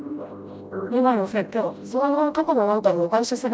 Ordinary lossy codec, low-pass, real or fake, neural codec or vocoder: none; none; fake; codec, 16 kHz, 0.5 kbps, FreqCodec, smaller model